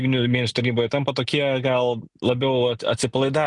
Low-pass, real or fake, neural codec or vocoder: 10.8 kHz; real; none